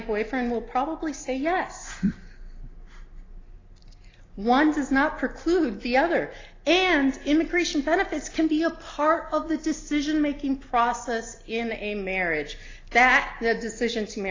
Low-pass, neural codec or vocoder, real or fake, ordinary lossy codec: 7.2 kHz; none; real; AAC, 32 kbps